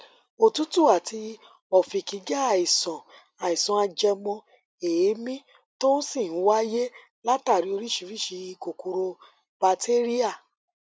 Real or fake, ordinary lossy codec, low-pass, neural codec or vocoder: real; none; none; none